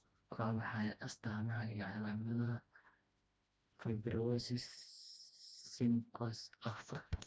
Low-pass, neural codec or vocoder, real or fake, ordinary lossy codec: none; codec, 16 kHz, 1 kbps, FreqCodec, smaller model; fake; none